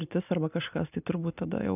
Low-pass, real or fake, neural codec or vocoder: 3.6 kHz; real; none